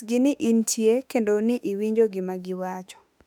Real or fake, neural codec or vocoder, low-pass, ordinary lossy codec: fake; autoencoder, 48 kHz, 32 numbers a frame, DAC-VAE, trained on Japanese speech; 19.8 kHz; none